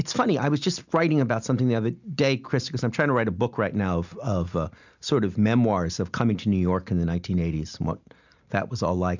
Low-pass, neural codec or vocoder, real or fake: 7.2 kHz; none; real